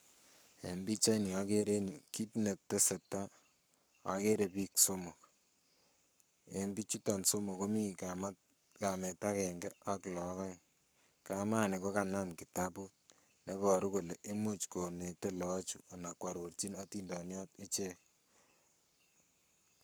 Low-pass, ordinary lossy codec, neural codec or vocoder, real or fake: none; none; codec, 44.1 kHz, 7.8 kbps, Pupu-Codec; fake